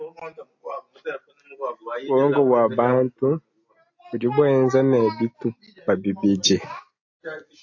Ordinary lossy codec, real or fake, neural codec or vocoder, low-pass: AAC, 48 kbps; real; none; 7.2 kHz